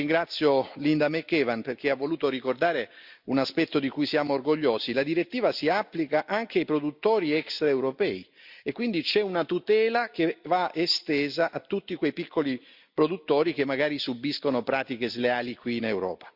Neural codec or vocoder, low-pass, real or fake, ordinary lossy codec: none; 5.4 kHz; real; Opus, 64 kbps